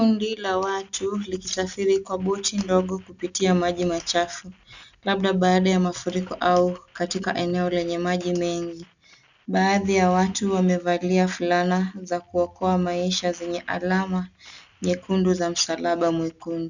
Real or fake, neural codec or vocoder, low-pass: real; none; 7.2 kHz